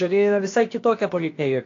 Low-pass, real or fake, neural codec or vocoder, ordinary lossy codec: 7.2 kHz; fake; codec, 16 kHz, about 1 kbps, DyCAST, with the encoder's durations; AAC, 48 kbps